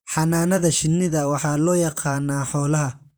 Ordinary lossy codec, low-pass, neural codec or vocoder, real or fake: none; none; vocoder, 44.1 kHz, 128 mel bands, Pupu-Vocoder; fake